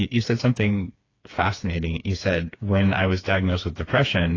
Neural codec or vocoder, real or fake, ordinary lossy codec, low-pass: codec, 16 kHz, 4 kbps, FreqCodec, smaller model; fake; AAC, 32 kbps; 7.2 kHz